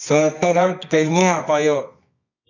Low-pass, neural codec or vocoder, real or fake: 7.2 kHz; codec, 24 kHz, 0.9 kbps, WavTokenizer, medium music audio release; fake